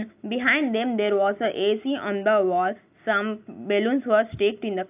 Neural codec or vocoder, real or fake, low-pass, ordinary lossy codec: none; real; 3.6 kHz; AAC, 32 kbps